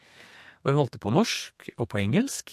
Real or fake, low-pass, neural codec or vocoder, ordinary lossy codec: fake; 14.4 kHz; codec, 32 kHz, 1.9 kbps, SNAC; MP3, 64 kbps